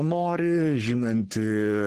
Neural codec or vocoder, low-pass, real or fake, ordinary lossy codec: codec, 44.1 kHz, 3.4 kbps, Pupu-Codec; 14.4 kHz; fake; Opus, 16 kbps